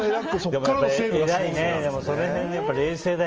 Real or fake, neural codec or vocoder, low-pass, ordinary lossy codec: real; none; 7.2 kHz; Opus, 24 kbps